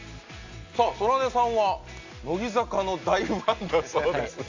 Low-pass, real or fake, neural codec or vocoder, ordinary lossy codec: 7.2 kHz; real; none; AAC, 48 kbps